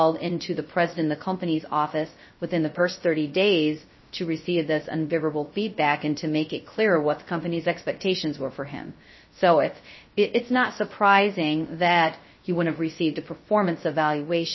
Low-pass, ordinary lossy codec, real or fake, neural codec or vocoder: 7.2 kHz; MP3, 24 kbps; fake; codec, 16 kHz, 0.2 kbps, FocalCodec